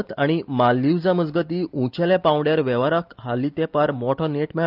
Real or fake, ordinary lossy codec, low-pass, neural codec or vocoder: real; Opus, 24 kbps; 5.4 kHz; none